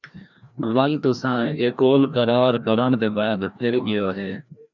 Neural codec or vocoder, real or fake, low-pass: codec, 16 kHz, 1 kbps, FreqCodec, larger model; fake; 7.2 kHz